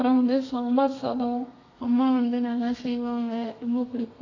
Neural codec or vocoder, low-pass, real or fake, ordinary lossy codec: codec, 32 kHz, 1.9 kbps, SNAC; 7.2 kHz; fake; AAC, 32 kbps